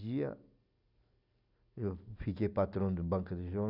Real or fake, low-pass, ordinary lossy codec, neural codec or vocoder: real; 5.4 kHz; none; none